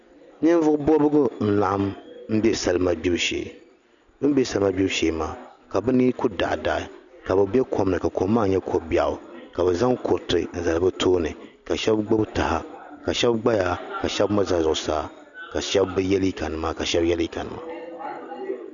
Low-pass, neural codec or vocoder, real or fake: 7.2 kHz; none; real